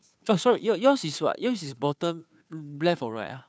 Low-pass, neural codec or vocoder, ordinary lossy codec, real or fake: none; codec, 16 kHz, 2 kbps, FunCodec, trained on Chinese and English, 25 frames a second; none; fake